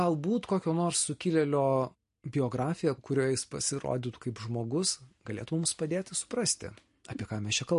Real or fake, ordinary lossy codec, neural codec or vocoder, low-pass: real; MP3, 48 kbps; none; 10.8 kHz